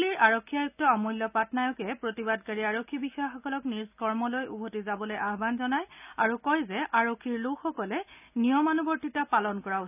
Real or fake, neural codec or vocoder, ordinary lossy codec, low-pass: real; none; none; 3.6 kHz